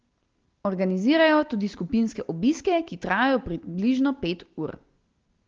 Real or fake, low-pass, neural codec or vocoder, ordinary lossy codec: real; 7.2 kHz; none; Opus, 16 kbps